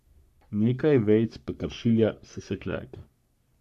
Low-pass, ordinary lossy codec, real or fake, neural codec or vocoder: 14.4 kHz; MP3, 96 kbps; fake; codec, 44.1 kHz, 3.4 kbps, Pupu-Codec